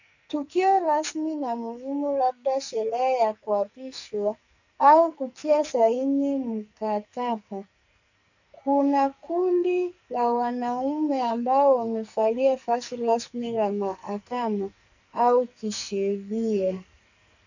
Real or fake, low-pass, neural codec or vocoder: fake; 7.2 kHz; codec, 44.1 kHz, 2.6 kbps, SNAC